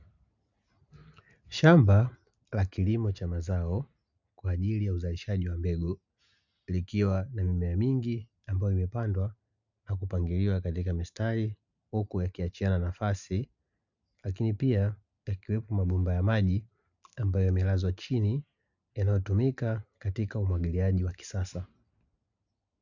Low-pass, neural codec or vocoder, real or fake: 7.2 kHz; none; real